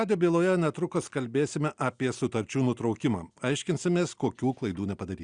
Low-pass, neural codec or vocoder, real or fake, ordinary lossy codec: 9.9 kHz; none; real; Opus, 64 kbps